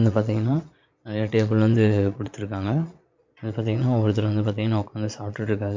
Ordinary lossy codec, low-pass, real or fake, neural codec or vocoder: MP3, 64 kbps; 7.2 kHz; fake; vocoder, 22.05 kHz, 80 mel bands, Vocos